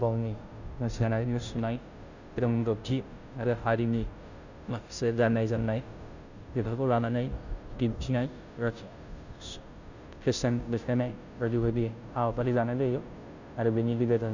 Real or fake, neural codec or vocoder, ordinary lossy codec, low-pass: fake; codec, 16 kHz, 0.5 kbps, FunCodec, trained on Chinese and English, 25 frames a second; none; 7.2 kHz